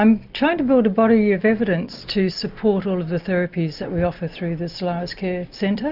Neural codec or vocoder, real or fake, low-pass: none; real; 5.4 kHz